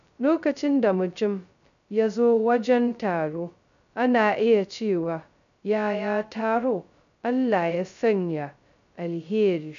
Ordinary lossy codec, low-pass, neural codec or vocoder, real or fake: none; 7.2 kHz; codec, 16 kHz, 0.2 kbps, FocalCodec; fake